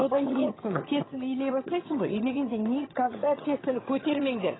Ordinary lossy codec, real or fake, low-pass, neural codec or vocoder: AAC, 16 kbps; fake; 7.2 kHz; vocoder, 22.05 kHz, 80 mel bands, HiFi-GAN